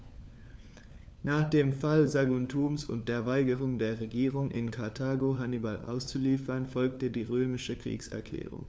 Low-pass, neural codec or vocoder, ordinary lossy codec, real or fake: none; codec, 16 kHz, 4 kbps, FunCodec, trained on LibriTTS, 50 frames a second; none; fake